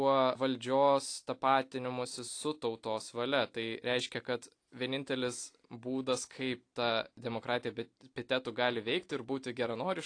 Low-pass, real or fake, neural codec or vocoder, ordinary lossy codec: 9.9 kHz; real; none; AAC, 48 kbps